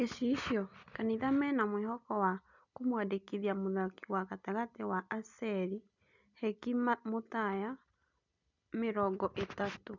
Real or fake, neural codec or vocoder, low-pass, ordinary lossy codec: real; none; 7.2 kHz; none